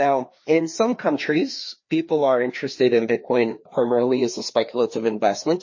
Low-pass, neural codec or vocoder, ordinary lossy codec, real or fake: 7.2 kHz; codec, 16 kHz, 2 kbps, FreqCodec, larger model; MP3, 32 kbps; fake